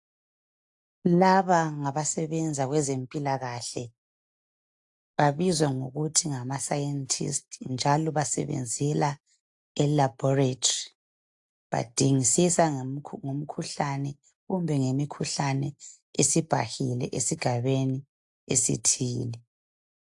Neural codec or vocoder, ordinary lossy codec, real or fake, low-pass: none; AAC, 64 kbps; real; 10.8 kHz